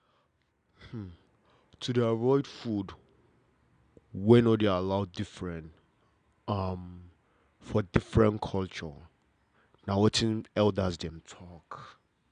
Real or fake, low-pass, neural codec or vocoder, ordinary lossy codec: real; 9.9 kHz; none; none